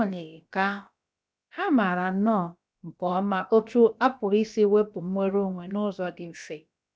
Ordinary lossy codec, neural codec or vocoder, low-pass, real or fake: none; codec, 16 kHz, about 1 kbps, DyCAST, with the encoder's durations; none; fake